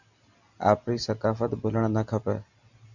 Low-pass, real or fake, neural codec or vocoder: 7.2 kHz; real; none